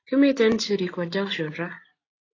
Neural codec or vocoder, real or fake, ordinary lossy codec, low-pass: none; real; AAC, 32 kbps; 7.2 kHz